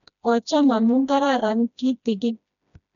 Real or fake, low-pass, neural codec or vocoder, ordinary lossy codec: fake; 7.2 kHz; codec, 16 kHz, 1 kbps, FreqCodec, smaller model; none